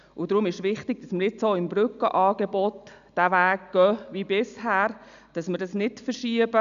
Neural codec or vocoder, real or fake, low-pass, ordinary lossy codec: none; real; 7.2 kHz; none